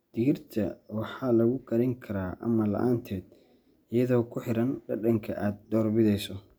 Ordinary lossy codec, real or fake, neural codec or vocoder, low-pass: none; real; none; none